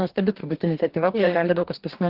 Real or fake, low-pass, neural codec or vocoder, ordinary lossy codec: fake; 5.4 kHz; codec, 44.1 kHz, 2.6 kbps, DAC; Opus, 16 kbps